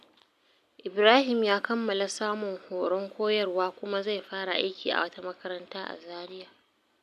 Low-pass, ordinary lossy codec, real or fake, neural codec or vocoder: 14.4 kHz; none; real; none